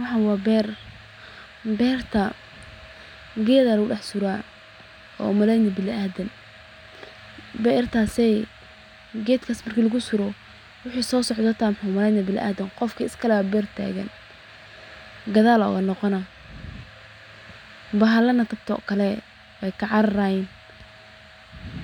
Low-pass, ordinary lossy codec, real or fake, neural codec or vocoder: 19.8 kHz; none; real; none